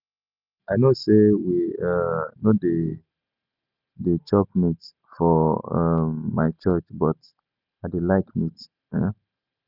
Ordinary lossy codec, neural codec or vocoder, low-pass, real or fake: none; none; 5.4 kHz; real